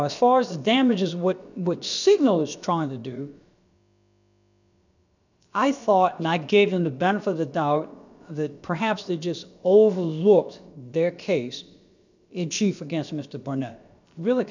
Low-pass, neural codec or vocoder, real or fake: 7.2 kHz; codec, 16 kHz, about 1 kbps, DyCAST, with the encoder's durations; fake